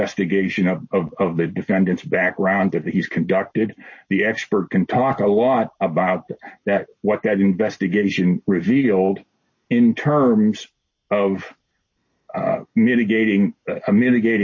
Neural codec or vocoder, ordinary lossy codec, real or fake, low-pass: none; MP3, 32 kbps; real; 7.2 kHz